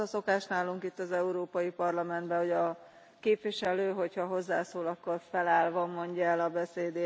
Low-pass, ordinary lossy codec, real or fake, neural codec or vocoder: none; none; real; none